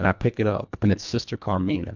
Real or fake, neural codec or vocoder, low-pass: fake; codec, 24 kHz, 1.5 kbps, HILCodec; 7.2 kHz